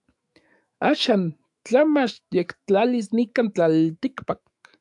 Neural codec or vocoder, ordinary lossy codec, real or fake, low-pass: autoencoder, 48 kHz, 128 numbers a frame, DAC-VAE, trained on Japanese speech; AAC, 64 kbps; fake; 10.8 kHz